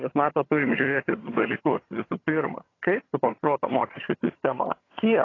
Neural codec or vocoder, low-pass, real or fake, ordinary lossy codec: vocoder, 22.05 kHz, 80 mel bands, HiFi-GAN; 7.2 kHz; fake; AAC, 32 kbps